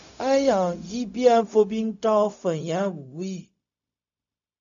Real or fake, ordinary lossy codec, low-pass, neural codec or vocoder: fake; MP3, 96 kbps; 7.2 kHz; codec, 16 kHz, 0.4 kbps, LongCat-Audio-Codec